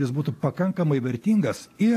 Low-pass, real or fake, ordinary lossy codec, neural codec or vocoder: 14.4 kHz; real; AAC, 64 kbps; none